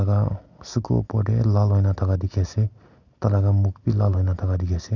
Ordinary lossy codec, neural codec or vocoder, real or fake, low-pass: none; none; real; 7.2 kHz